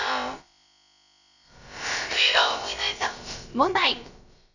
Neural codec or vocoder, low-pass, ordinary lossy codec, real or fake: codec, 16 kHz, about 1 kbps, DyCAST, with the encoder's durations; 7.2 kHz; none; fake